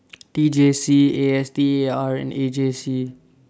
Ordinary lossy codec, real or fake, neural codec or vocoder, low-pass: none; real; none; none